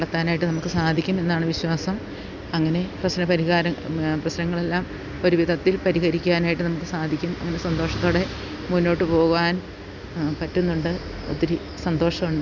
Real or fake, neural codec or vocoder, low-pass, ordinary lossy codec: real; none; 7.2 kHz; none